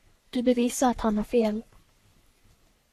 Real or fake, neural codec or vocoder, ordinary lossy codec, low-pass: fake; codec, 44.1 kHz, 3.4 kbps, Pupu-Codec; AAC, 64 kbps; 14.4 kHz